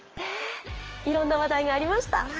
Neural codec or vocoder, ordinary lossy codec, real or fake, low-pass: codec, 16 kHz, 6 kbps, DAC; Opus, 24 kbps; fake; 7.2 kHz